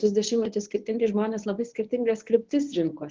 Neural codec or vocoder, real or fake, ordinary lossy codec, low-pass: codec, 24 kHz, 0.9 kbps, WavTokenizer, medium speech release version 1; fake; Opus, 16 kbps; 7.2 kHz